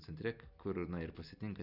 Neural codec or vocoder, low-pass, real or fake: none; 5.4 kHz; real